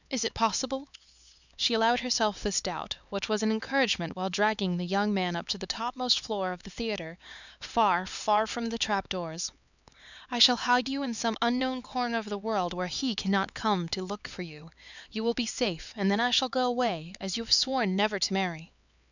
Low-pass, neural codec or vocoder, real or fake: 7.2 kHz; codec, 16 kHz, 4 kbps, X-Codec, HuBERT features, trained on LibriSpeech; fake